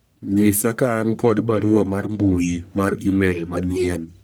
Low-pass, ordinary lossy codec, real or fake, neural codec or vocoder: none; none; fake; codec, 44.1 kHz, 1.7 kbps, Pupu-Codec